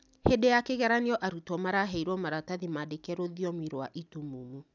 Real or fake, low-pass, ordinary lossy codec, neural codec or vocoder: real; 7.2 kHz; none; none